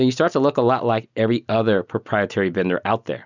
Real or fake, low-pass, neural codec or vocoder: real; 7.2 kHz; none